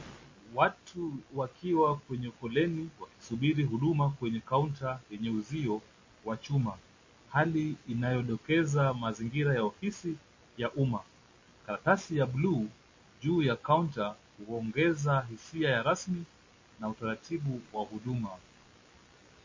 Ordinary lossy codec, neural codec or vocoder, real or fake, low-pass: MP3, 32 kbps; none; real; 7.2 kHz